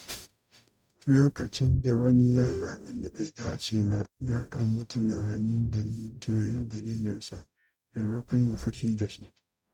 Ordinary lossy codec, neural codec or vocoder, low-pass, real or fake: none; codec, 44.1 kHz, 0.9 kbps, DAC; 19.8 kHz; fake